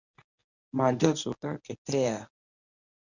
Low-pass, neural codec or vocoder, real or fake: 7.2 kHz; codec, 24 kHz, 0.9 kbps, WavTokenizer, medium speech release version 1; fake